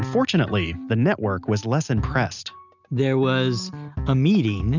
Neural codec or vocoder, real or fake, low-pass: none; real; 7.2 kHz